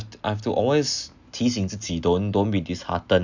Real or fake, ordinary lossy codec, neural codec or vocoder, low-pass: real; none; none; 7.2 kHz